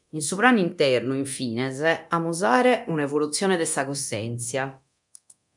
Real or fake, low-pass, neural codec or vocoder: fake; 10.8 kHz; codec, 24 kHz, 0.9 kbps, DualCodec